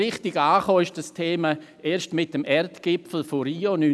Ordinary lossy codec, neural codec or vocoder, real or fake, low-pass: none; none; real; none